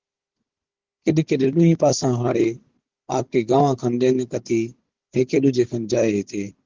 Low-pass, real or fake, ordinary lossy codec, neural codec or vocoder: 7.2 kHz; fake; Opus, 16 kbps; codec, 16 kHz, 16 kbps, FunCodec, trained on Chinese and English, 50 frames a second